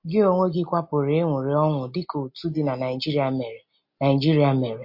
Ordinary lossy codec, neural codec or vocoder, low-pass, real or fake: MP3, 32 kbps; none; 5.4 kHz; real